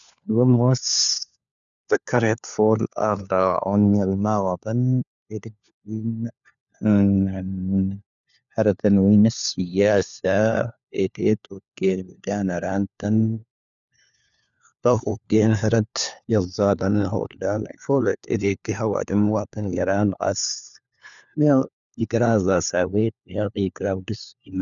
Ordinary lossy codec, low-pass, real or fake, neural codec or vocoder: none; 7.2 kHz; fake; codec, 16 kHz, 4 kbps, FunCodec, trained on LibriTTS, 50 frames a second